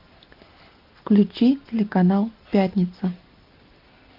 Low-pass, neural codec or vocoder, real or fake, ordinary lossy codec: 5.4 kHz; none; real; Opus, 32 kbps